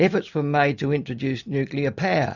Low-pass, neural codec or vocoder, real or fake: 7.2 kHz; none; real